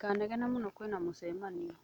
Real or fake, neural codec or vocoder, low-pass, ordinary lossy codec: real; none; 19.8 kHz; none